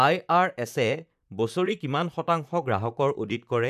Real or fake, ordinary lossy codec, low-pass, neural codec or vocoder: fake; none; 14.4 kHz; vocoder, 44.1 kHz, 128 mel bands, Pupu-Vocoder